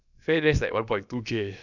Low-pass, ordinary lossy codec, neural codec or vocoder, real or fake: 7.2 kHz; none; codec, 16 kHz, about 1 kbps, DyCAST, with the encoder's durations; fake